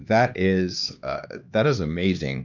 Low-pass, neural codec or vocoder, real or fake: 7.2 kHz; codec, 16 kHz, 2 kbps, X-Codec, HuBERT features, trained on LibriSpeech; fake